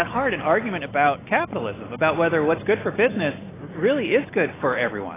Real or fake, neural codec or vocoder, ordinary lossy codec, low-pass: real; none; AAC, 16 kbps; 3.6 kHz